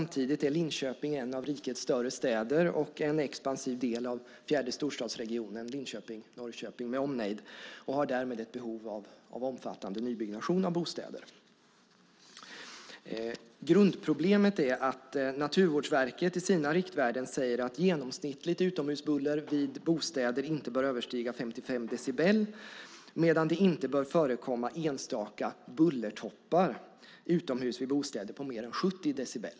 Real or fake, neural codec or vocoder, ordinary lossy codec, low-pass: real; none; none; none